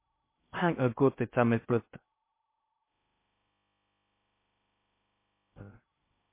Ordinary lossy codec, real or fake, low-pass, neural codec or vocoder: MP3, 24 kbps; fake; 3.6 kHz; codec, 16 kHz in and 24 kHz out, 0.6 kbps, FocalCodec, streaming, 2048 codes